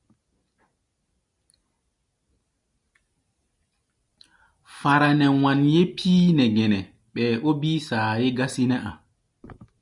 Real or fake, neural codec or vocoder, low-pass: real; none; 10.8 kHz